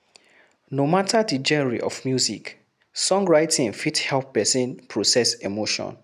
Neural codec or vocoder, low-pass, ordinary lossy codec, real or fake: none; 14.4 kHz; none; real